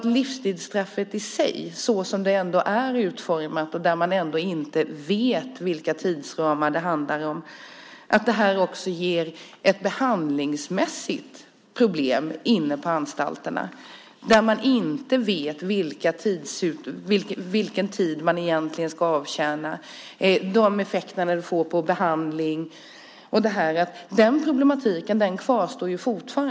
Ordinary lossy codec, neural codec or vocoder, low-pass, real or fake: none; none; none; real